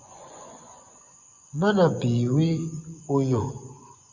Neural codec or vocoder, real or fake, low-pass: vocoder, 24 kHz, 100 mel bands, Vocos; fake; 7.2 kHz